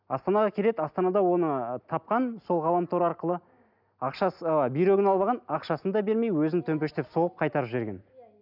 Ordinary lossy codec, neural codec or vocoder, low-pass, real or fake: none; none; 5.4 kHz; real